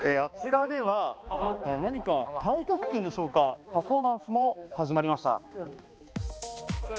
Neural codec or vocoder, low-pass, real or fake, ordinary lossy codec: codec, 16 kHz, 2 kbps, X-Codec, HuBERT features, trained on balanced general audio; none; fake; none